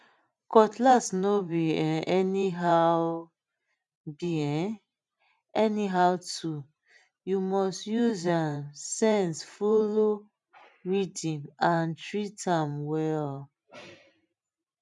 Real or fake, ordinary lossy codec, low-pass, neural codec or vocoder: fake; none; 10.8 kHz; vocoder, 44.1 kHz, 128 mel bands every 512 samples, BigVGAN v2